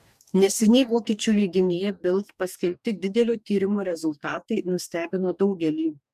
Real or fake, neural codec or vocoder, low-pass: fake; codec, 44.1 kHz, 2.6 kbps, DAC; 14.4 kHz